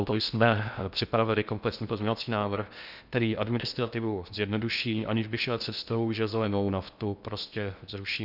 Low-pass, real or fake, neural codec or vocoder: 5.4 kHz; fake; codec, 16 kHz in and 24 kHz out, 0.6 kbps, FocalCodec, streaming, 2048 codes